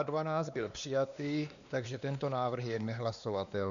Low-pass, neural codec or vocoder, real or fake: 7.2 kHz; codec, 16 kHz, 2 kbps, X-Codec, WavLM features, trained on Multilingual LibriSpeech; fake